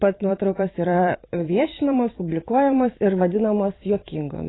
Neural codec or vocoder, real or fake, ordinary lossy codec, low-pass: vocoder, 44.1 kHz, 128 mel bands every 512 samples, BigVGAN v2; fake; AAC, 16 kbps; 7.2 kHz